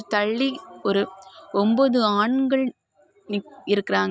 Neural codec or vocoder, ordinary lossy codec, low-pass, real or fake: none; none; none; real